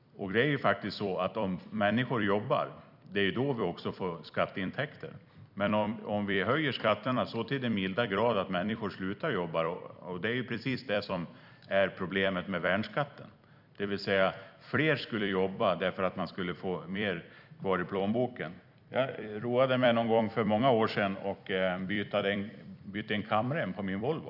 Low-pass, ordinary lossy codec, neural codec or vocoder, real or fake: 5.4 kHz; none; vocoder, 44.1 kHz, 128 mel bands every 256 samples, BigVGAN v2; fake